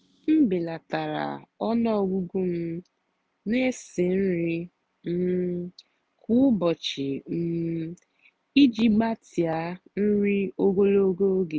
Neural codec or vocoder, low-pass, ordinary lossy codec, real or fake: none; none; none; real